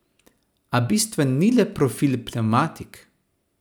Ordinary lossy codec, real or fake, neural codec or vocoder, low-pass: none; real; none; none